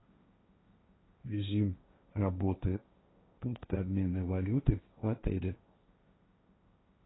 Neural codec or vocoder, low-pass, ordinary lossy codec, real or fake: codec, 16 kHz, 1.1 kbps, Voila-Tokenizer; 7.2 kHz; AAC, 16 kbps; fake